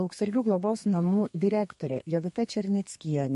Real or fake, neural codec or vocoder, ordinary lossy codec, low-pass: fake; codec, 32 kHz, 1.9 kbps, SNAC; MP3, 48 kbps; 14.4 kHz